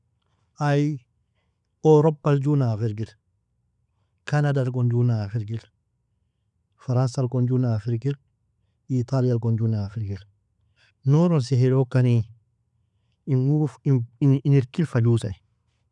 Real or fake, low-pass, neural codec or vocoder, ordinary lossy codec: real; 10.8 kHz; none; none